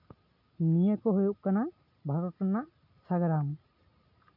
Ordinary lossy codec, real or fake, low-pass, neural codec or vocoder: none; real; 5.4 kHz; none